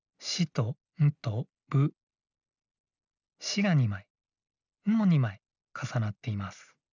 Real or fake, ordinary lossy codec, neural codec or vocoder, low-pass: real; none; none; 7.2 kHz